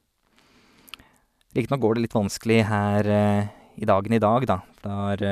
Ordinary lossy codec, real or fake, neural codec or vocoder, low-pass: none; real; none; 14.4 kHz